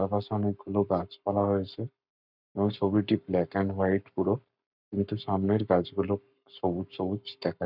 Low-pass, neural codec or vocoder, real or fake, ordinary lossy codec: 5.4 kHz; codec, 44.1 kHz, 7.8 kbps, Pupu-Codec; fake; none